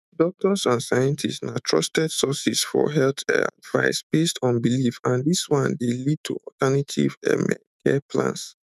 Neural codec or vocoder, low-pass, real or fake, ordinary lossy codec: autoencoder, 48 kHz, 128 numbers a frame, DAC-VAE, trained on Japanese speech; 14.4 kHz; fake; none